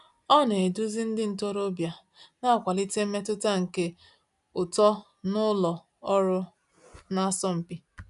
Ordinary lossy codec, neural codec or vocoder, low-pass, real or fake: none; none; 10.8 kHz; real